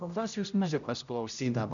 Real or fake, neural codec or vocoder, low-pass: fake; codec, 16 kHz, 0.5 kbps, X-Codec, HuBERT features, trained on general audio; 7.2 kHz